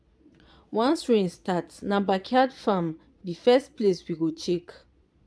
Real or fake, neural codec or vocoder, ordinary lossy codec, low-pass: fake; vocoder, 22.05 kHz, 80 mel bands, Vocos; none; none